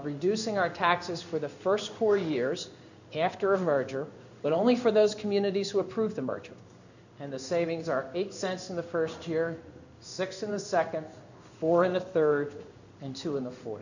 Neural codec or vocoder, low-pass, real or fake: codec, 16 kHz in and 24 kHz out, 1 kbps, XY-Tokenizer; 7.2 kHz; fake